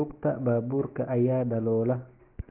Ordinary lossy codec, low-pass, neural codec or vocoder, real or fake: Opus, 16 kbps; 3.6 kHz; none; real